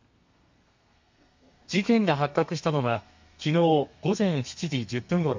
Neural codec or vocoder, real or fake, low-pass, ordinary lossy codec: codec, 32 kHz, 1.9 kbps, SNAC; fake; 7.2 kHz; MP3, 48 kbps